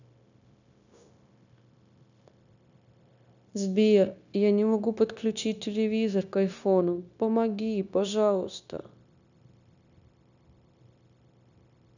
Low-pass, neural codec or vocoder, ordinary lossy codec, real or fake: 7.2 kHz; codec, 16 kHz, 0.9 kbps, LongCat-Audio-Codec; none; fake